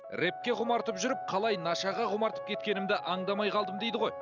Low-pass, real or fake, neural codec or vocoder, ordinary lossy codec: 7.2 kHz; real; none; none